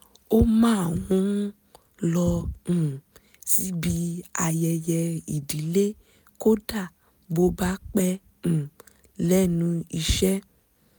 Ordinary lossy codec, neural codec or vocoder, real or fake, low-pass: none; none; real; none